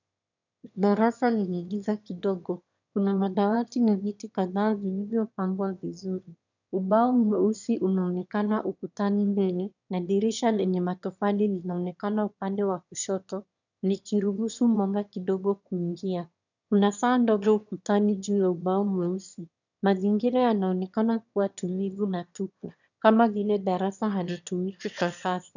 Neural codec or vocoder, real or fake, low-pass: autoencoder, 22.05 kHz, a latent of 192 numbers a frame, VITS, trained on one speaker; fake; 7.2 kHz